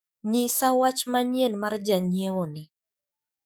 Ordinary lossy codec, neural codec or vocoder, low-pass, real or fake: none; codec, 44.1 kHz, 7.8 kbps, DAC; none; fake